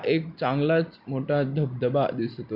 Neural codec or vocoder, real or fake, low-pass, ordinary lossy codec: none; real; 5.4 kHz; none